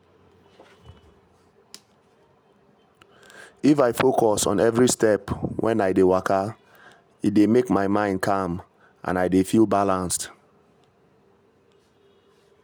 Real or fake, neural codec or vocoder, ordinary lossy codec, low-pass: real; none; none; none